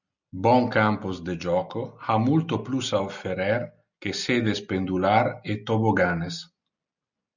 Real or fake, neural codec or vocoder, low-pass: real; none; 7.2 kHz